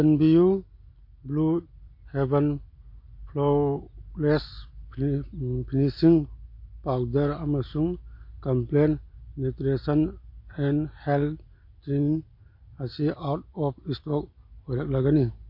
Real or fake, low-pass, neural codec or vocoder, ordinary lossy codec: real; 5.4 kHz; none; MP3, 32 kbps